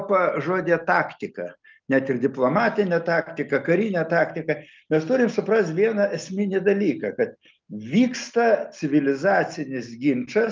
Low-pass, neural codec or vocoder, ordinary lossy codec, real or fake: 7.2 kHz; none; Opus, 24 kbps; real